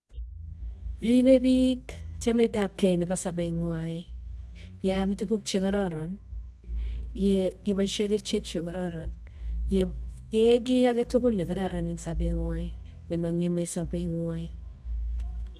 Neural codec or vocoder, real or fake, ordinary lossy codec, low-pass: codec, 24 kHz, 0.9 kbps, WavTokenizer, medium music audio release; fake; none; none